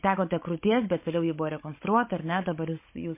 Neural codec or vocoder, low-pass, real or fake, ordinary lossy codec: codec, 16 kHz, 16 kbps, FunCodec, trained on Chinese and English, 50 frames a second; 3.6 kHz; fake; MP3, 24 kbps